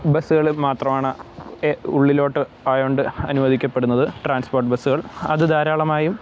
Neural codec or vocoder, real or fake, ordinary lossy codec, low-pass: none; real; none; none